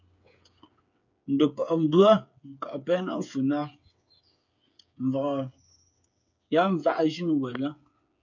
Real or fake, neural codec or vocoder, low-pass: fake; codec, 16 kHz, 8 kbps, FreqCodec, smaller model; 7.2 kHz